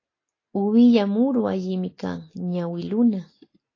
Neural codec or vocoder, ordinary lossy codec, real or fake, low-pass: none; AAC, 32 kbps; real; 7.2 kHz